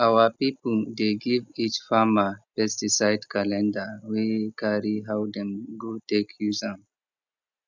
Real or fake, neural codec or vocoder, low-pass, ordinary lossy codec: real; none; 7.2 kHz; none